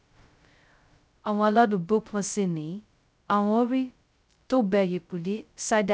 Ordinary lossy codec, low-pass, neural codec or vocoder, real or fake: none; none; codec, 16 kHz, 0.2 kbps, FocalCodec; fake